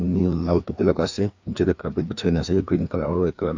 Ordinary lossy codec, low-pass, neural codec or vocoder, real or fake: AAC, 48 kbps; 7.2 kHz; codec, 16 kHz, 2 kbps, FreqCodec, larger model; fake